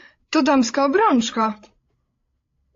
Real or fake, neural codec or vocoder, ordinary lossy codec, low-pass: fake; codec, 16 kHz, 8 kbps, FreqCodec, larger model; AAC, 48 kbps; 7.2 kHz